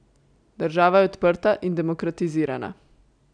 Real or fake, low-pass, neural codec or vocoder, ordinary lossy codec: real; 9.9 kHz; none; none